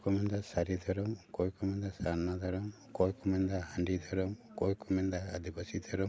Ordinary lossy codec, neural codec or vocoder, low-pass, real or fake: none; none; none; real